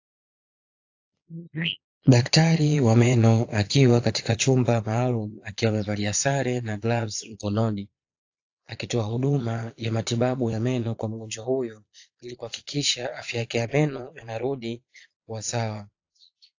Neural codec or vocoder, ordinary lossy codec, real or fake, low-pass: vocoder, 22.05 kHz, 80 mel bands, Vocos; AAC, 48 kbps; fake; 7.2 kHz